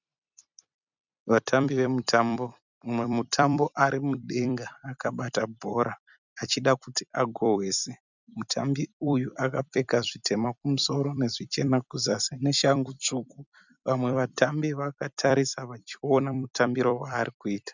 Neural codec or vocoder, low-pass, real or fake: vocoder, 44.1 kHz, 128 mel bands every 256 samples, BigVGAN v2; 7.2 kHz; fake